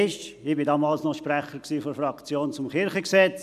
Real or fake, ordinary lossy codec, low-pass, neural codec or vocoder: real; none; 14.4 kHz; none